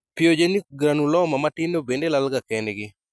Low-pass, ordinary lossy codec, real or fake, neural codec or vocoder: none; none; real; none